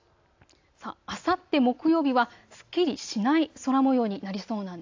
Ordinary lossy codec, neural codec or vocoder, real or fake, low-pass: AAC, 48 kbps; none; real; 7.2 kHz